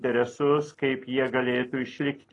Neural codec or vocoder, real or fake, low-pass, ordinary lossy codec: none; real; 10.8 kHz; AAC, 32 kbps